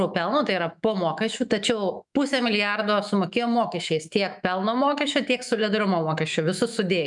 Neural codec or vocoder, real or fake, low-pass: autoencoder, 48 kHz, 128 numbers a frame, DAC-VAE, trained on Japanese speech; fake; 10.8 kHz